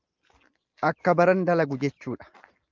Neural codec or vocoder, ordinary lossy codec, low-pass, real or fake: none; Opus, 24 kbps; 7.2 kHz; real